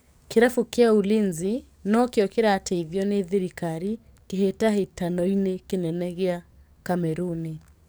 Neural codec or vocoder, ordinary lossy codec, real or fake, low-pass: codec, 44.1 kHz, 7.8 kbps, DAC; none; fake; none